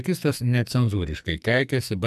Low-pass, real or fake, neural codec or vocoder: 14.4 kHz; fake; codec, 44.1 kHz, 2.6 kbps, SNAC